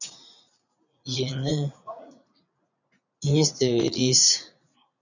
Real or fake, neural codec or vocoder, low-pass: fake; vocoder, 44.1 kHz, 80 mel bands, Vocos; 7.2 kHz